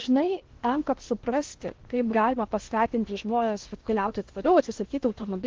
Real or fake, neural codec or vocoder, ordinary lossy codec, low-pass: fake; codec, 16 kHz in and 24 kHz out, 0.8 kbps, FocalCodec, streaming, 65536 codes; Opus, 16 kbps; 7.2 kHz